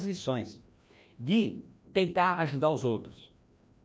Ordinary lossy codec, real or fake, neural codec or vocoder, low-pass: none; fake; codec, 16 kHz, 1 kbps, FreqCodec, larger model; none